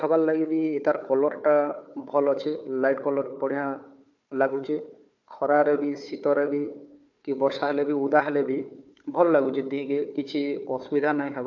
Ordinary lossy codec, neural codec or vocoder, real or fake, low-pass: MP3, 64 kbps; codec, 16 kHz, 4 kbps, FunCodec, trained on Chinese and English, 50 frames a second; fake; 7.2 kHz